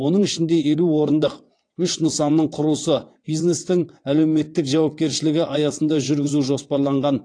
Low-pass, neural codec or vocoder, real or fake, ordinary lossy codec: 9.9 kHz; vocoder, 22.05 kHz, 80 mel bands, WaveNeXt; fake; AAC, 48 kbps